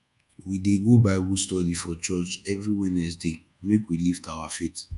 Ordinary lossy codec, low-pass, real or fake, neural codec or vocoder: none; 10.8 kHz; fake; codec, 24 kHz, 1.2 kbps, DualCodec